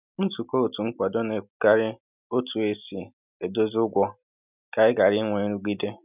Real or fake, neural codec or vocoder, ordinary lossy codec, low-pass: real; none; none; 3.6 kHz